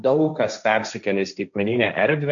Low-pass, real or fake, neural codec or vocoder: 7.2 kHz; fake; codec, 16 kHz, 1.1 kbps, Voila-Tokenizer